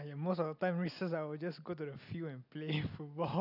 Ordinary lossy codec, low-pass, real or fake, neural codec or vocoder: none; 5.4 kHz; real; none